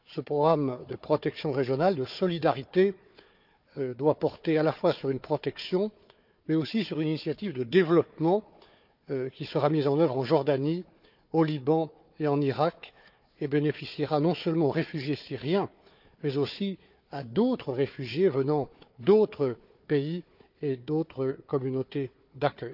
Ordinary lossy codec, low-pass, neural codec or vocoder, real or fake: none; 5.4 kHz; codec, 16 kHz, 4 kbps, FunCodec, trained on Chinese and English, 50 frames a second; fake